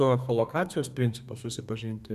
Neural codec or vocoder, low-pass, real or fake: codec, 32 kHz, 1.9 kbps, SNAC; 14.4 kHz; fake